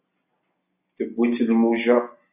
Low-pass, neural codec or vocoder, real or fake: 3.6 kHz; none; real